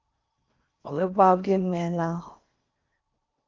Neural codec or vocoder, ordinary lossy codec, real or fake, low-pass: codec, 16 kHz in and 24 kHz out, 0.6 kbps, FocalCodec, streaming, 4096 codes; Opus, 24 kbps; fake; 7.2 kHz